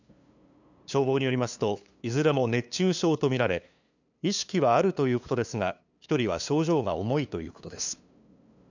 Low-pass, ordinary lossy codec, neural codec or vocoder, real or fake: 7.2 kHz; none; codec, 16 kHz, 2 kbps, FunCodec, trained on LibriTTS, 25 frames a second; fake